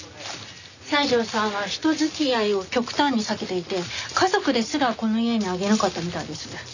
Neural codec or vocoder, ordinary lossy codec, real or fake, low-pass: none; none; real; 7.2 kHz